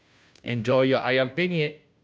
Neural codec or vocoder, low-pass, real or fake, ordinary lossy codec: codec, 16 kHz, 0.5 kbps, FunCodec, trained on Chinese and English, 25 frames a second; none; fake; none